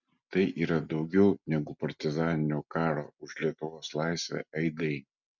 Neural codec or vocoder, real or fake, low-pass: none; real; 7.2 kHz